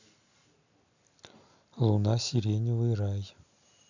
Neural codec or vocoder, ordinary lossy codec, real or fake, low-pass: none; none; real; 7.2 kHz